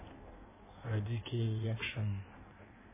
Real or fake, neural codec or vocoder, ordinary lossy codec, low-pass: fake; codec, 16 kHz in and 24 kHz out, 1.1 kbps, FireRedTTS-2 codec; MP3, 16 kbps; 3.6 kHz